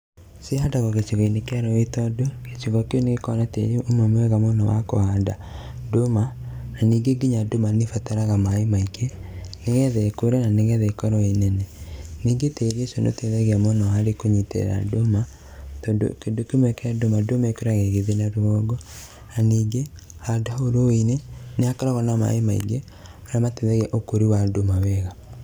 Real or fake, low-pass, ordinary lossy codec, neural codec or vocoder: real; none; none; none